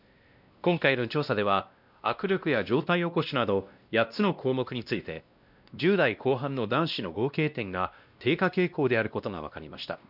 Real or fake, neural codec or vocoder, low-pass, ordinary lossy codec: fake; codec, 16 kHz, 1 kbps, X-Codec, WavLM features, trained on Multilingual LibriSpeech; 5.4 kHz; none